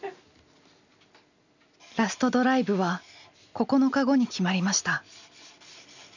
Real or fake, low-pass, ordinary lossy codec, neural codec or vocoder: real; 7.2 kHz; none; none